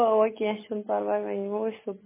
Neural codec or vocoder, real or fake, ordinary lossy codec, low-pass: none; real; MP3, 16 kbps; 3.6 kHz